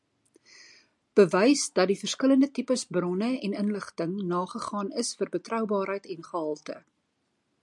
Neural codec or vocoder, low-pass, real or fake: none; 10.8 kHz; real